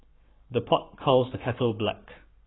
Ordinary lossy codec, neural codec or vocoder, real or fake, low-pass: AAC, 16 kbps; codec, 44.1 kHz, 7.8 kbps, Pupu-Codec; fake; 7.2 kHz